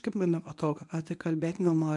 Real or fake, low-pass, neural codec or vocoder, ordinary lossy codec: fake; 10.8 kHz; codec, 24 kHz, 0.9 kbps, WavTokenizer, medium speech release version 1; MP3, 64 kbps